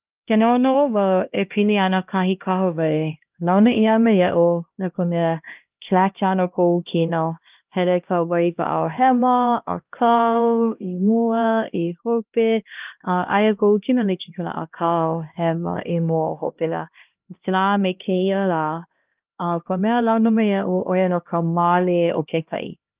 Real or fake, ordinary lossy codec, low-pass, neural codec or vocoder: fake; Opus, 24 kbps; 3.6 kHz; codec, 16 kHz, 1 kbps, X-Codec, HuBERT features, trained on LibriSpeech